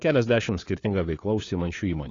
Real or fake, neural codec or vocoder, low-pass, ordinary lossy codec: fake; codec, 16 kHz, 4 kbps, FunCodec, trained on LibriTTS, 50 frames a second; 7.2 kHz; AAC, 32 kbps